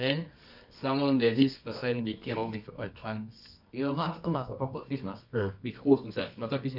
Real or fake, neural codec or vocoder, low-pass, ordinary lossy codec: fake; codec, 24 kHz, 0.9 kbps, WavTokenizer, medium music audio release; 5.4 kHz; none